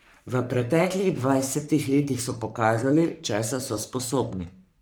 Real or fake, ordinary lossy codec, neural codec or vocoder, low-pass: fake; none; codec, 44.1 kHz, 3.4 kbps, Pupu-Codec; none